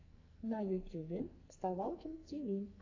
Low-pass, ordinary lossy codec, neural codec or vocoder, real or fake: 7.2 kHz; AAC, 48 kbps; codec, 44.1 kHz, 2.6 kbps, SNAC; fake